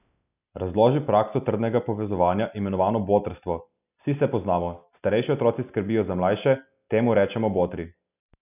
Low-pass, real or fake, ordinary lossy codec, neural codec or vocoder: 3.6 kHz; real; none; none